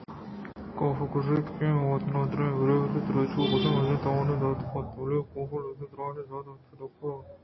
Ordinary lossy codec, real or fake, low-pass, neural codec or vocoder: MP3, 24 kbps; real; 7.2 kHz; none